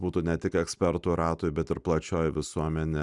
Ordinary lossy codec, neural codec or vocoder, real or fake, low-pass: Opus, 64 kbps; none; real; 10.8 kHz